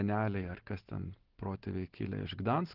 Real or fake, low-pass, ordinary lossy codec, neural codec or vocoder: real; 5.4 kHz; Opus, 16 kbps; none